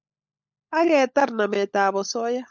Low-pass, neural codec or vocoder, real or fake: 7.2 kHz; codec, 16 kHz, 16 kbps, FunCodec, trained on LibriTTS, 50 frames a second; fake